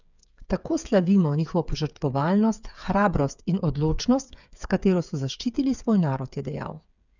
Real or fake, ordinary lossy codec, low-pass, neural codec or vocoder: fake; none; 7.2 kHz; codec, 16 kHz, 8 kbps, FreqCodec, smaller model